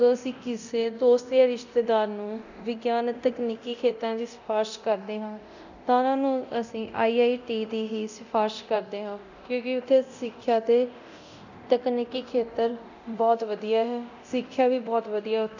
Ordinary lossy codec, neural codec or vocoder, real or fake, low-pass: none; codec, 24 kHz, 0.9 kbps, DualCodec; fake; 7.2 kHz